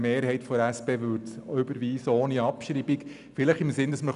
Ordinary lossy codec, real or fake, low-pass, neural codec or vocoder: none; real; 10.8 kHz; none